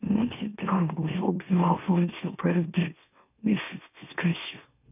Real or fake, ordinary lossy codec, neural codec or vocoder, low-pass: fake; none; autoencoder, 44.1 kHz, a latent of 192 numbers a frame, MeloTTS; 3.6 kHz